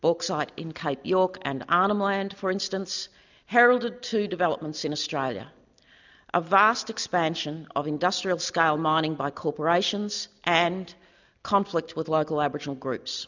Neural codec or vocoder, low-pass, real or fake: none; 7.2 kHz; real